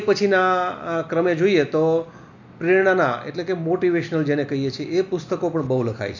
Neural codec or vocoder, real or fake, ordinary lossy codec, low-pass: none; real; none; 7.2 kHz